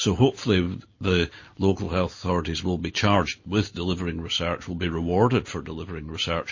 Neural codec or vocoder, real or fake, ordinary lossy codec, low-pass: none; real; MP3, 32 kbps; 7.2 kHz